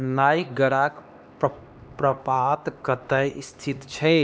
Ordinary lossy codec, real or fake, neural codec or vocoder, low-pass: none; fake; codec, 16 kHz, 2 kbps, X-Codec, HuBERT features, trained on LibriSpeech; none